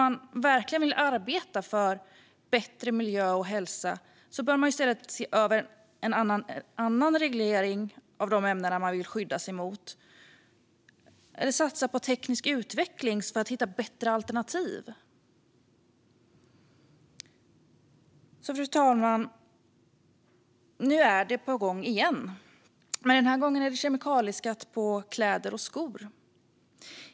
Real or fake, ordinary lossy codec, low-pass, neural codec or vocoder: real; none; none; none